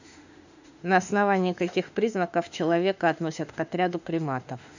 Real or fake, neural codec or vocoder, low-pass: fake; autoencoder, 48 kHz, 32 numbers a frame, DAC-VAE, trained on Japanese speech; 7.2 kHz